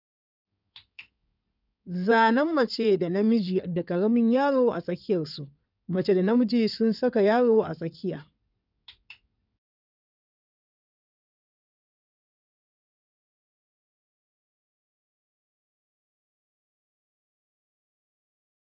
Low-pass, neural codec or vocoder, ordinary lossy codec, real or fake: 5.4 kHz; codec, 16 kHz in and 24 kHz out, 2.2 kbps, FireRedTTS-2 codec; none; fake